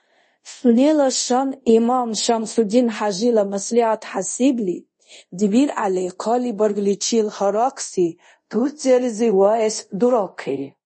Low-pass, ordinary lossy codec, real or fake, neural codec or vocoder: 10.8 kHz; MP3, 32 kbps; fake; codec, 24 kHz, 0.5 kbps, DualCodec